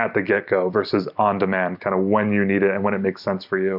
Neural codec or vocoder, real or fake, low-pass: none; real; 5.4 kHz